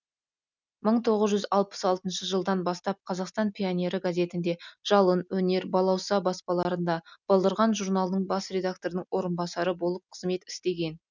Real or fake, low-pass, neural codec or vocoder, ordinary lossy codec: real; 7.2 kHz; none; none